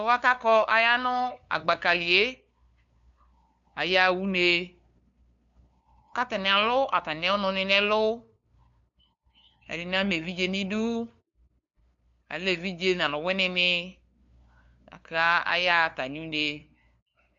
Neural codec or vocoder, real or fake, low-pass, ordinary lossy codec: codec, 16 kHz, 2 kbps, FunCodec, trained on LibriTTS, 25 frames a second; fake; 7.2 kHz; MP3, 64 kbps